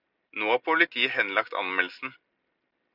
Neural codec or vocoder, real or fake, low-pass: none; real; 5.4 kHz